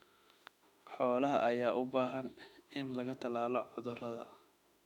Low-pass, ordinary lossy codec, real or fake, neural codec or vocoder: 19.8 kHz; none; fake; autoencoder, 48 kHz, 32 numbers a frame, DAC-VAE, trained on Japanese speech